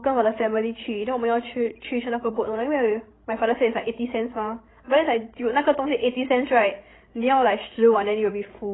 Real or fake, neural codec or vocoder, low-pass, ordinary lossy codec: fake; codec, 16 kHz, 16 kbps, FreqCodec, larger model; 7.2 kHz; AAC, 16 kbps